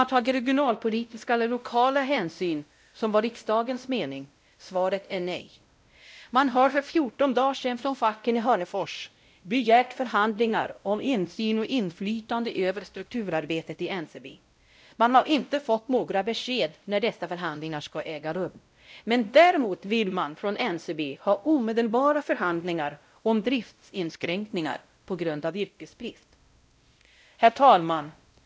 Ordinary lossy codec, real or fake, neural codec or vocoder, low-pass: none; fake; codec, 16 kHz, 0.5 kbps, X-Codec, WavLM features, trained on Multilingual LibriSpeech; none